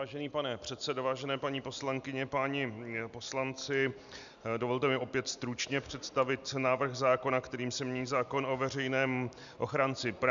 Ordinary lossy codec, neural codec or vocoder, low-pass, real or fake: MP3, 96 kbps; none; 7.2 kHz; real